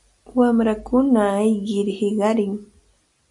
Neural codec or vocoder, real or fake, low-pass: none; real; 10.8 kHz